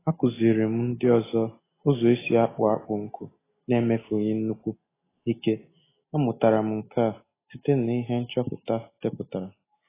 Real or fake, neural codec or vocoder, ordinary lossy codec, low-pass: real; none; AAC, 16 kbps; 3.6 kHz